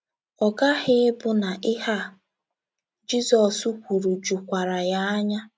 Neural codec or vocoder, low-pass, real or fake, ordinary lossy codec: none; none; real; none